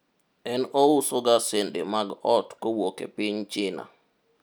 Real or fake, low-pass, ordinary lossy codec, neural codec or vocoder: real; none; none; none